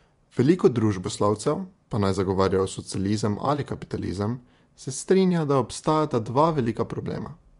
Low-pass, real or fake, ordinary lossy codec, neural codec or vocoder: 10.8 kHz; fake; MP3, 64 kbps; vocoder, 24 kHz, 100 mel bands, Vocos